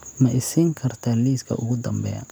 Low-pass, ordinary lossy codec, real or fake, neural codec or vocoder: none; none; real; none